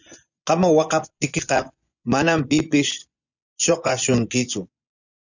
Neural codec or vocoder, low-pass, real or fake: vocoder, 24 kHz, 100 mel bands, Vocos; 7.2 kHz; fake